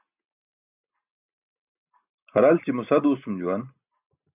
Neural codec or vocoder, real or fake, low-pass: none; real; 3.6 kHz